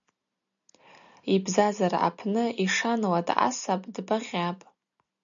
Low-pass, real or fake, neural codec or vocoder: 7.2 kHz; real; none